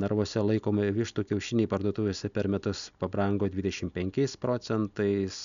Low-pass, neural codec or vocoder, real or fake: 7.2 kHz; none; real